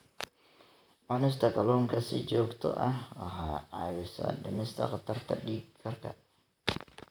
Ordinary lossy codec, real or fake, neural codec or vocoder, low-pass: none; fake; vocoder, 44.1 kHz, 128 mel bands, Pupu-Vocoder; none